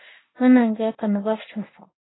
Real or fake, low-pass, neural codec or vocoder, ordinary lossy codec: fake; 7.2 kHz; codec, 16 kHz in and 24 kHz out, 1 kbps, XY-Tokenizer; AAC, 16 kbps